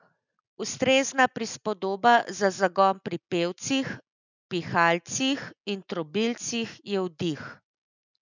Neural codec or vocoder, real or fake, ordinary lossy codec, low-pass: none; real; none; 7.2 kHz